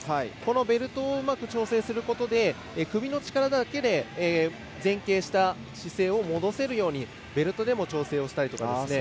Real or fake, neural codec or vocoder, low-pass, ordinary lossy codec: real; none; none; none